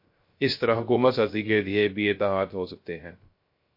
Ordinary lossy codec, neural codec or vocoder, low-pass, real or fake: MP3, 32 kbps; codec, 16 kHz, 0.3 kbps, FocalCodec; 5.4 kHz; fake